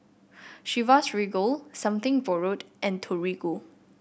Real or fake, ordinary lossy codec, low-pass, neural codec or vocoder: real; none; none; none